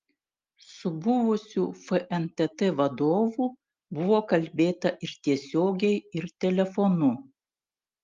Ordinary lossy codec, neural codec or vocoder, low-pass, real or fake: Opus, 32 kbps; none; 7.2 kHz; real